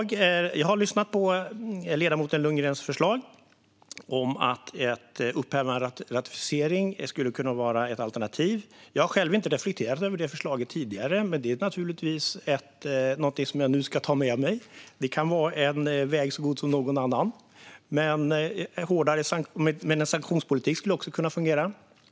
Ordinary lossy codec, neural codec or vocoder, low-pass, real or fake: none; none; none; real